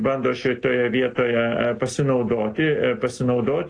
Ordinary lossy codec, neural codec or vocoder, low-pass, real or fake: AAC, 48 kbps; none; 9.9 kHz; real